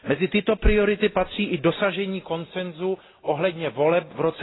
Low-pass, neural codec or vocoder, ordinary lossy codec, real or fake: 7.2 kHz; none; AAC, 16 kbps; real